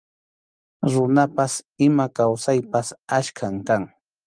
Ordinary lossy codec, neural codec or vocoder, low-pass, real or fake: Opus, 32 kbps; none; 9.9 kHz; real